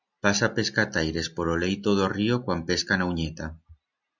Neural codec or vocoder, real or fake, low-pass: none; real; 7.2 kHz